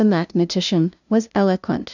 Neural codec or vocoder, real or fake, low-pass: codec, 16 kHz, 0.5 kbps, FunCodec, trained on LibriTTS, 25 frames a second; fake; 7.2 kHz